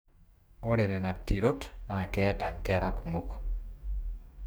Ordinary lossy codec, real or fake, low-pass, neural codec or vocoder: none; fake; none; codec, 44.1 kHz, 2.6 kbps, DAC